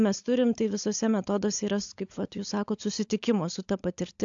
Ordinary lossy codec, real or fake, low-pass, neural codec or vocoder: AAC, 64 kbps; fake; 7.2 kHz; codec, 16 kHz, 16 kbps, FunCodec, trained on LibriTTS, 50 frames a second